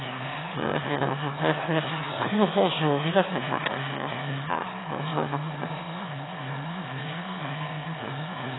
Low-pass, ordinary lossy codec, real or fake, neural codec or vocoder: 7.2 kHz; AAC, 16 kbps; fake; autoencoder, 22.05 kHz, a latent of 192 numbers a frame, VITS, trained on one speaker